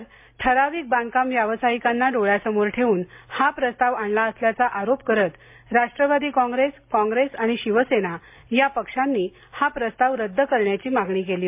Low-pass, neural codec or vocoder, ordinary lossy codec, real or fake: 3.6 kHz; none; MP3, 32 kbps; real